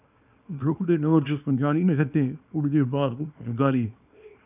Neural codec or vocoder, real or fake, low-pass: codec, 24 kHz, 0.9 kbps, WavTokenizer, small release; fake; 3.6 kHz